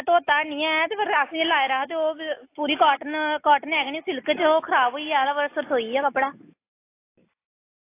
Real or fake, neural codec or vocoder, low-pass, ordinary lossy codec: real; none; 3.6 kHz; AAC, 24 kbps